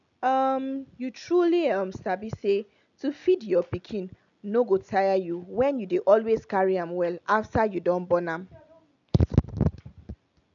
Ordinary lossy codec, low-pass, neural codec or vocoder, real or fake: none; 7.2 kHz; none; real